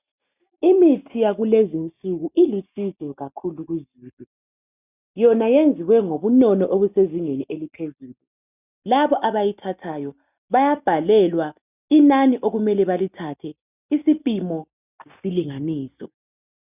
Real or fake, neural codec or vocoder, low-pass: real; none; 3.6 kHz